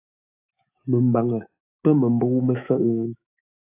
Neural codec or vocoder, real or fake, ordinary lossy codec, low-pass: autoencoder, 48 kHz, 128 numbers a frame, DAC-VAE, trained on Japanese speech; fake; AAC, 32 kbps; 3.6 kHz